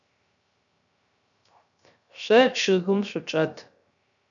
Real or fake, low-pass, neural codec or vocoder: fake; 7.2 kHz; codec, 16 kHz, 0.3 kbps, FocalCodec